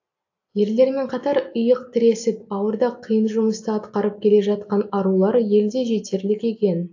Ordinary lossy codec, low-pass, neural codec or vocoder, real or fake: AAC, 48 kbps; 7.2 kHz; none; real